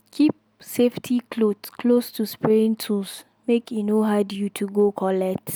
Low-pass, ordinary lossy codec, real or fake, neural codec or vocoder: none; none; real; none